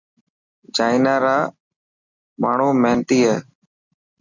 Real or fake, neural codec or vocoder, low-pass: real; none; 7.2 kHz